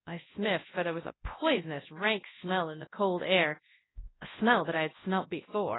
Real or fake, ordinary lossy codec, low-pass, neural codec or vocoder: fake; AAC, 16 kbps; 7.2 kHz; codec, 24 kHz, 0.9 kbps, WavTokenizer, large speech release